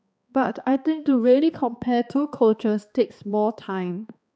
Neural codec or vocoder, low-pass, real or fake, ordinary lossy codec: codec, 16 kHz, 2 kbps, X-Codec, HuBERT features, trained on balanced general audio; none; fake; none